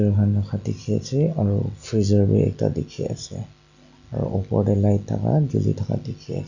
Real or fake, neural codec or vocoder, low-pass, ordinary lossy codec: real; none; 7.2 kHz; none